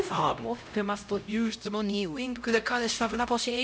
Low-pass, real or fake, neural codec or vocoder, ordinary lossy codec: none; fake; codec, 16 kHz, 0.5 kbps, X-Codec, HuBERT features, trained on LibriSpeech; none